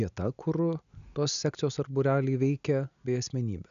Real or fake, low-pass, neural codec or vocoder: real; 7.2 kHz; none